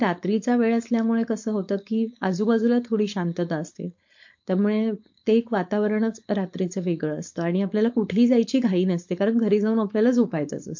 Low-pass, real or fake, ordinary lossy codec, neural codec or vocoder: 7.2 kHz; fake; MP3, 48 kbps; codec, 16 kHz, 4.8 kbps, FACodec